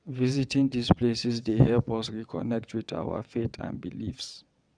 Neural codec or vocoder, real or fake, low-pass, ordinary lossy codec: vocoder, 22.05 kHz, 80 mel bands, WaveNeXt; fake; 9.9 kHz; none